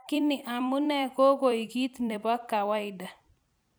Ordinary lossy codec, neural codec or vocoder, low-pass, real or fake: none; vocoder, 44.1 kHz, 128 mel bands every 256 samples, BigVGAN v2; none; fake